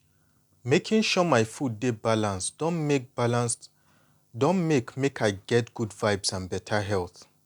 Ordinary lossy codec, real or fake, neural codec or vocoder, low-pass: none; real; none; 19.8 kHz